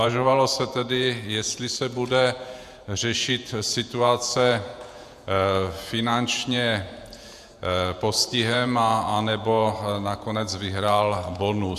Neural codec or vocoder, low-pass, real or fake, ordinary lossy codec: vocoder, 48 kHz, 128 mel bands, Vocos; 14.4 kHz; fake; AAC, 96 kbps